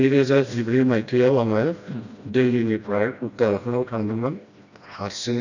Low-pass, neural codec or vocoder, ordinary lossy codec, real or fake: 7.2 kHz; codec, 16 kHz, 1 kbps, FreqCodec, smaller model; none; fake